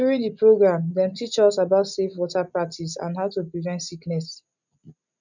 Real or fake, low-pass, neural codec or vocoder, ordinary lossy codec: real; 7.2 kHz; none; none